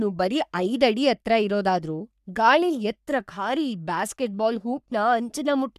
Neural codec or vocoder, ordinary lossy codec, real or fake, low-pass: codec, 44.1 kHz, 3.4 kbps, Pupu-Codec; none; fake; 14.4 kHz